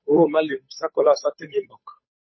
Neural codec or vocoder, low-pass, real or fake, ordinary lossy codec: codec, 16 kHz, 8 kbps, FunCodec, trained on Chinese and English, 25 frames a second; 7.2 kHz; fake; MP3, 24 kbps